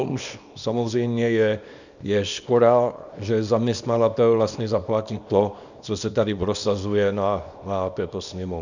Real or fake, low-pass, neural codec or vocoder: fake; 7.2 kHz; codec, 24 kHz, 0.9 kbps, WavTokenizer, small release